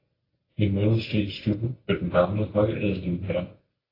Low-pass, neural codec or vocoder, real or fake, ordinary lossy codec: 5.4 kHz; none; real; AAC, 24 kbps